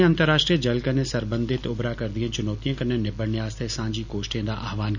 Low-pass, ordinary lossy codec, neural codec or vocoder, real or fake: 7.2 kHz; none; none; real